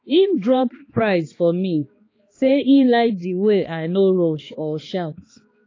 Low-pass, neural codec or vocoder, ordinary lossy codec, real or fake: 7.2 kHz; codec, 16 kHz, 2 kbps, X-Codec, HuBERT features, trained on balanced general audio; AAC, 32 kbps; fake